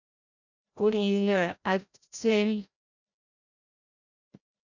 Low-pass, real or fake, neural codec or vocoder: 7.2 kHz; fake; codec, 16 kHz, 0.5 kbps, FreqCodec, larger model